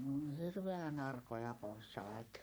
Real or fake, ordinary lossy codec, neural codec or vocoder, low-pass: fake; none; codec, 44.1 kHz, 3.4 kbps, Pupu-Codec; none